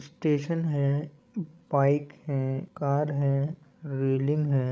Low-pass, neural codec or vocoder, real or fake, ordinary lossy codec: none; codec, 16 kHz, 16 kbps, FreqCodec, larger model; fake; none